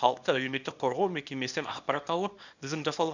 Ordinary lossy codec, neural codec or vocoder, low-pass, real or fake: none; codec, 24 kHz, 0.9 kbps, WavTokenizer, small release; 7.2 kHz; fake